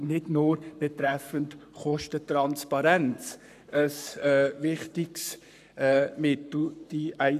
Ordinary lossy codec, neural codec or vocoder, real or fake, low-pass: none; codec, 44.1 kHz, 7.8 kbps, Pupu-Codec; fake; 14.4 kHz